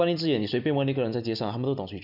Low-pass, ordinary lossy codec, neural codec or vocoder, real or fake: 5.4 kHz; none; none; real